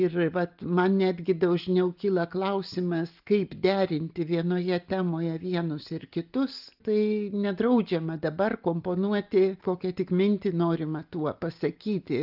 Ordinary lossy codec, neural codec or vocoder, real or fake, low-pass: Opus, 32 kbps; none; real; 5.4 kHz